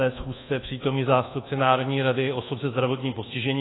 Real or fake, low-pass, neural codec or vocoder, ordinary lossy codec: fake; 7.2 kHz; codec, 24 kHz, 1.2 kbps, DualCodec; AAC, 16 kbps